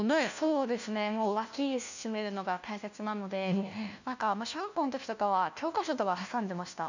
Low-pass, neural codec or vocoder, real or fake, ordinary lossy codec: 7.2 kHz; codec, 16 kHz, 1 kbps, FunCodec, trained on LibriTTS, 50 frames a second; fake; none